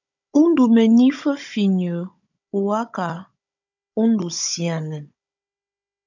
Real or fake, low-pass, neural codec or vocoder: fake; 7.2 kHz; codec, 16 kHz, 16 kbps, FunCodec, trained on Chinese and English, 50 frames a second